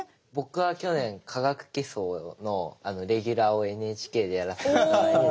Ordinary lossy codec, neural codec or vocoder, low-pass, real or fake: none; none; none; real